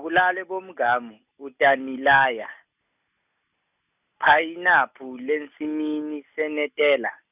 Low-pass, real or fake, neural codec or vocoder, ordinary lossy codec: 3.6 kHz; real; none; none